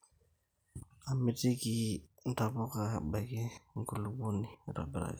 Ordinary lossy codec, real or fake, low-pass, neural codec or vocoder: none; real; none; none